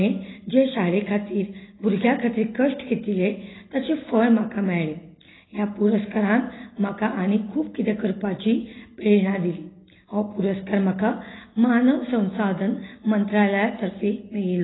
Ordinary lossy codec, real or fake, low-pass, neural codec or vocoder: AAC, 16 kbps; real; 7.2 kHz; none